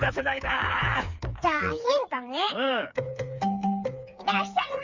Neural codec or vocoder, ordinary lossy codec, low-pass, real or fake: codec, 16 kHz, 4 kbps, FreqCodec, smaller model; Opus, 64 kbps; 7.2 kHz; fake